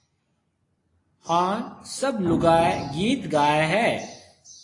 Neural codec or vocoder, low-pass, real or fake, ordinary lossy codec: none; 10.8 kHz; real; AAC, 32 kbps